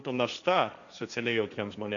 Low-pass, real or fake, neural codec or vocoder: 7.2 kHz; fake; codec, 16 kHz, 1.1 kbps, Voila-Tokenizer